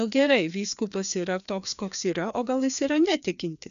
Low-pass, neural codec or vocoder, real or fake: 7.2 kHz; codec, 16 kHz, 2 kbps, FreqCodec, larger model; fake